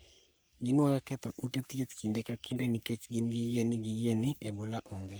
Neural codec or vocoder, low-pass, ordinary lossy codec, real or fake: codec, 44.1 kHz, 3.4 kbps, Pupu-Codec; none; none; fake